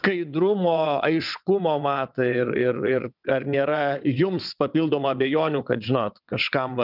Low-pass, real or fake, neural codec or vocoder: 5.4 kHz; fake; vocoder, 22.05 kHz, 80 mel bands, WaveNeXt